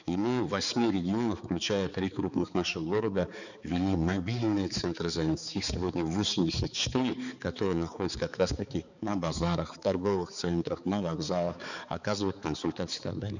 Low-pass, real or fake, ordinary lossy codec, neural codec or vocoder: 7.2 kHz; fake; none; codec, 16 kHz, 4 kbps, X-Codec, HuBERT features, trained on balanced general audio